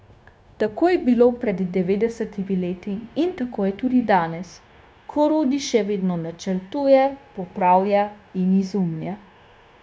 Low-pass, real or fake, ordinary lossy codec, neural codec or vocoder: none; fake; none; codec, 16 kHz, 0.9 kbps, LongCat-Audio-Codec